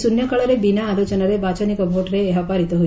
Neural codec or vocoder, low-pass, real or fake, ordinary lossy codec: none; none; real; none